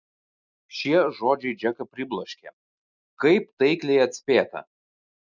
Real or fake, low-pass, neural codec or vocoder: real; 7.2 kHz; none